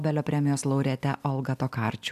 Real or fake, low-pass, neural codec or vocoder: real; 14.4 kHz; none